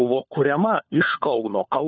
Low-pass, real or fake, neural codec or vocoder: 7.2 kHz; fake; codec, 16 kHz, 4 kbps, FunCodec, trained on LibriTTS, 50 frames a second